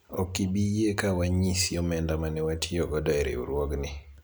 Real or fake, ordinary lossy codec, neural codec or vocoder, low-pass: real; none; none; none